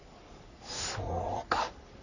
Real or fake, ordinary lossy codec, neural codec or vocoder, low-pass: fake; none; codec, 44.1 kHz, 3.4 kbps, Pupu-Codec; 7.2 kHz